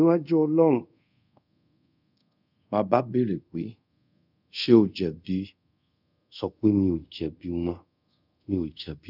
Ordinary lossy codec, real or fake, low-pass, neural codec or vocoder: none; fake; 5.4 kHz; codec, 24 kHz, 0.5 kbps, DualCodec